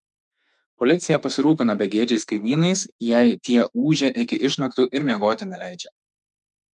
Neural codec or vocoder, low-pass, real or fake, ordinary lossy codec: autoencoder, 48 kHz, 32 numbers a frame, DAC-VAE, trained on Japanese speech; 10.8 kHz; fake; MP3, 96 kbps